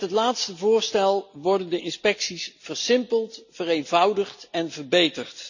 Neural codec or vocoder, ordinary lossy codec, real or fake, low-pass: none; none; real; 7.2 kHz